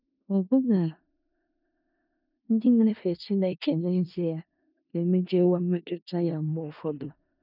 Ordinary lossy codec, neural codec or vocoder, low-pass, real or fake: none; codec, 16 kHz in and 24 kHz out, 0.4 kbps, LongCat-Audio-Codec, four codebook decoder; 5.4 kHz; fake